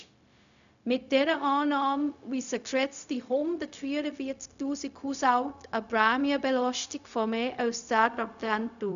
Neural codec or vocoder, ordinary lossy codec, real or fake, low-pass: codec, 16 kHz, 0.4 kbps, LongCat-Audio-Codec; none; fake; 7.2 kHz